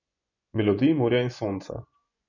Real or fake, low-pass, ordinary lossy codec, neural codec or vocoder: fake; 7.2 kHz; none; vocoder, 24 kHz, 100 mel bands, Vocos